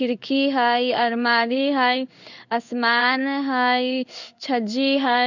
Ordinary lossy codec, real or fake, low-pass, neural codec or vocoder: none; fake; 7.2 kHz; codec, 16 kHz in and 24 kHz out, 1 kbps, XY-Tokenizer